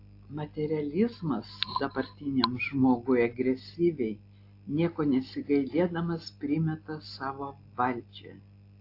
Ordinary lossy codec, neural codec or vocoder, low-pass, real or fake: AAC, 32 kbps; none; 5.4 kHz; real